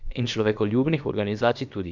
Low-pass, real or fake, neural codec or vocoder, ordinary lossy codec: 7.2 kHz; fake; codec, 16 kHz, about 1 kbps, DyCAST, with the encoder's durations; none